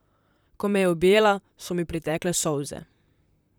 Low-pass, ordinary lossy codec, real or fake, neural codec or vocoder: none; none; fake; vocoder, 44.1 kHz, 128 mel bands, Pupu-Vocoder